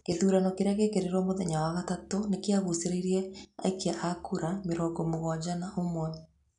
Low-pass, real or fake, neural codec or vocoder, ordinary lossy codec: 10.8 kHz; real; none; none